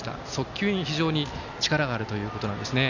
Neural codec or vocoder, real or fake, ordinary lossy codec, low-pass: none; real; none; 7.2 kHz